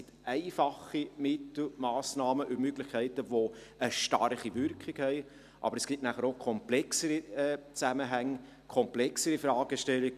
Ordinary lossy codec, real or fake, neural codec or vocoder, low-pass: none; real; none; 14.4 kHz